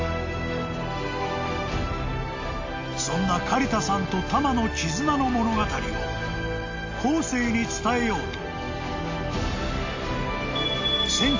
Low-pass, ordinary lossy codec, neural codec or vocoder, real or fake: 7.2 kHz; AAC, 48 kbps; none; real